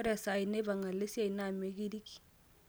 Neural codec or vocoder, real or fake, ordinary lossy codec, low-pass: none; real; none; none